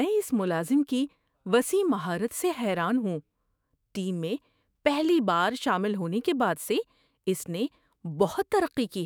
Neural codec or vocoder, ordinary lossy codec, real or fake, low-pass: autoencoder, 48 kHz, 128 numbers a frame, DAC-VAE, trained on Japanese speech; none; fake; none